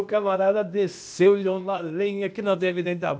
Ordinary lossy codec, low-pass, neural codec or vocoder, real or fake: none; none; codec, 16 kHz, 0.8 kbps, ZipCodec; fake